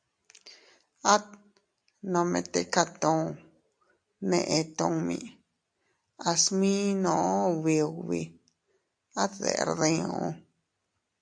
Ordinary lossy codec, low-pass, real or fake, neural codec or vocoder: AAC, 64 kbps; 9.9 kHz; real; none